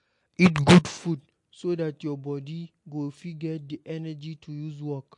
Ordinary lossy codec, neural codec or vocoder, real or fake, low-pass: MP3, 48 kbps; none; real; 10.8 kHz